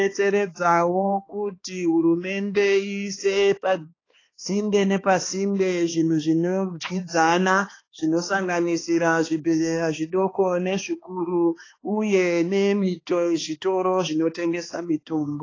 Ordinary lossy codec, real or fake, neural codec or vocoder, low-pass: AAC, 32 kbps; fake; codec, 16 kHz, 2 kbps, X-Codec, HuBERT features, trained on balanced general audio; 7.2 kHz